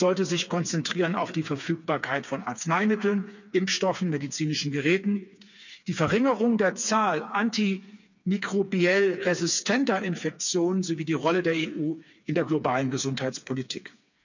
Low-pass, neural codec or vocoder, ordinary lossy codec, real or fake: 7.2 kHz; codec, 16 kHz, 4 kbps, FreqCodec, smaller model; none; fake